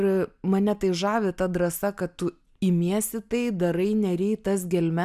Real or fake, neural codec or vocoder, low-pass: real; none; 14.4 kHz